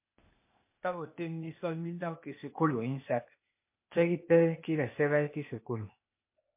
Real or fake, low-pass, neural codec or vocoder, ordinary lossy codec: fake; 3.6 kHz; codec, 16 kHz, 0.8 kbps, ZipCodec; MP3, 24 kbps